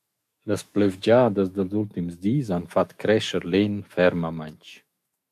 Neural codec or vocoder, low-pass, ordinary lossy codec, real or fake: autoencoder, 48 kHz, 128 numbers a frame, DAC-VAE, trained on Japanese speech; 14.4 kHz; MP3, 96 kbps; fake